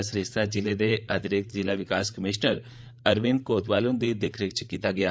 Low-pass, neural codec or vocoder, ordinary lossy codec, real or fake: none; codec, 16 kHz, 8 kbps, FreqCodec, larger model; none; fake